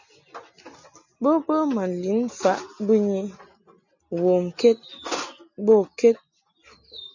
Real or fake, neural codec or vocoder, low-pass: real; none; 7.2 kHz